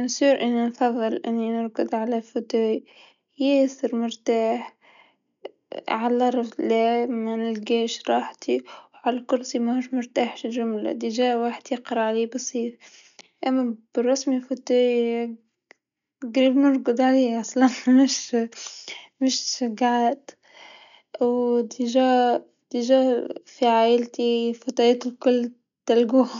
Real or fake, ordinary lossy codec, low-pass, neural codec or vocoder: real; none; 7.2 kHz; none